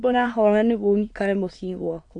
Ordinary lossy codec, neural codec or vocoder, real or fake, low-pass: AAC, 48 kbps; autoencoder, 22.05 kHz, a latent of 192 numbers a frame, VITS, trained on many speakers; fake; 9.9 kHz